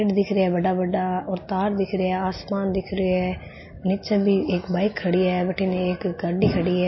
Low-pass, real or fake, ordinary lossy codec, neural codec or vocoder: 7.2 kHz; real; MP3, 24 kbps; none